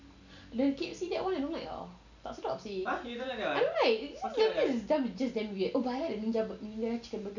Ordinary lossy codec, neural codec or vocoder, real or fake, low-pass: none; none; real; 7.2 kHz